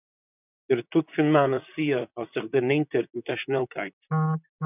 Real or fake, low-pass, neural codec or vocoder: fake; 3.6 kHz; vocoder, 44.1 kHz, 128 mel bands, Pupu-Vocoder